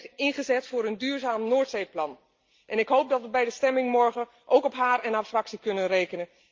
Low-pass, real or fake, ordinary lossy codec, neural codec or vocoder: 7.2 kHz; real; Opus, 24 kbps; none